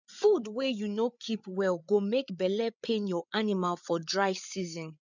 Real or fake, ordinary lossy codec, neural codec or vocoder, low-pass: fake; none; codec, 16 kHz, 8 kbps, FreqCodec, larger model; 7.2 kHz